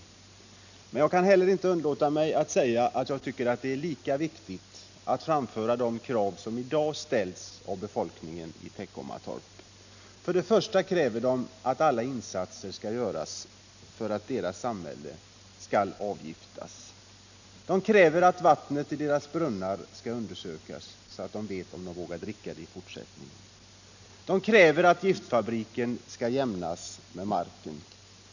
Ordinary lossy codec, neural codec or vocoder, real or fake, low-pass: none; none; real; 7.2 kHz